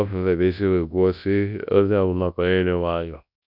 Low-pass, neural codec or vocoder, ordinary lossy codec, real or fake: 5.4 kHz; codec, 24 kHz, 0.9 kbps, WavTokenizer, large speech release; none; fake